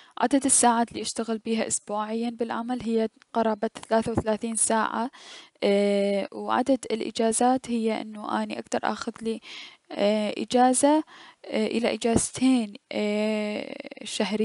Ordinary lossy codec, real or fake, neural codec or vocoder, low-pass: none; real; none; 10.8 kHz